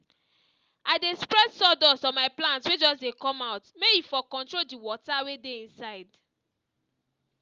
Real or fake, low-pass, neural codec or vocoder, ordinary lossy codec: real; 7.2 kHz; none; Opus, 32 kbps